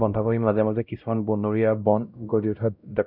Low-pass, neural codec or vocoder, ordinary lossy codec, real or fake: 5.4 kHz; codec, 16 kHz, 0.5 kbps, X-Codec, WavLM features, trained on Multilingual LibriSpeech; none; fake